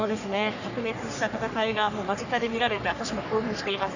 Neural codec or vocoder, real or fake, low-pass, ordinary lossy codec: codec, 44.1 kHz, 3.4 kbps, Pupu-Codec; fake; 7.2 kHz; none